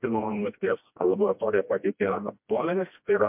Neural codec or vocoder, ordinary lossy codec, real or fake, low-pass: codec, 16 kHz, 1 kbps, FreqCodec, smaller model; MP3, 32 kbps; fake; 3.6 kHz